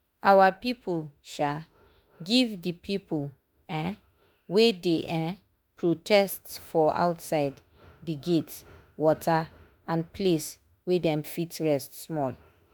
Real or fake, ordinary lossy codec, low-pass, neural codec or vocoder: fake; none; none; autoencoder, 48 kHz, 32 numbers a frame, DAC-VAE, trained on Japanese speech